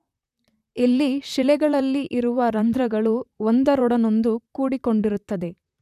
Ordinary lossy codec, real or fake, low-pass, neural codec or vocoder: none; fake; 14.4 kHz; vocoder, 44.1 kHz, 128 mel bands, Pupu-Vocoder